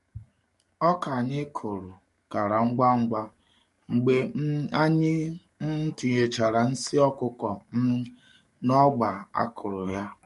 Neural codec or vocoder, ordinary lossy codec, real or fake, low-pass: codec, 44.1 kHz, 7.8 kbps, DAC; MP3, 48 kbps; fake; 14.4 kHz